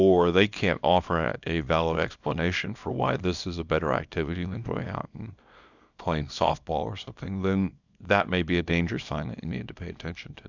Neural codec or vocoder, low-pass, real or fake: codec, 24 kHz, 0.9 kbps, WavTokenizer, small release; 7.2 kHz; fake